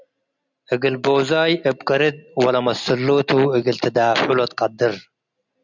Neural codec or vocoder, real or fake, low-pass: none; real; 7.2 kHz